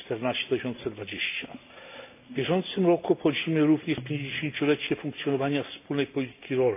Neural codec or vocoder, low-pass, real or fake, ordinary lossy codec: none; 3.6 kHz; real; AAC, 32 kbps